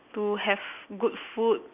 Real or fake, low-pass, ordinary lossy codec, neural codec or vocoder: real; 3.6 kHz; none; none